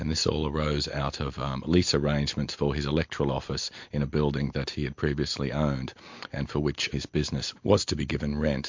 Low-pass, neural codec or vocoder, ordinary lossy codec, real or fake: 7.2 kHz; none; MP3, 48 kbps; real